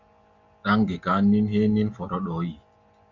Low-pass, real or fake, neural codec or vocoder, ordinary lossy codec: 7.2 kHz; real; none; Opus, 64 kbps